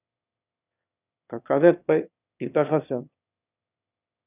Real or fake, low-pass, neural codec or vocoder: fake; 3.6 kHz; autoencoder, 22.05 kHz, a latent of 192 numbers a frame, VITS, trained on one speaker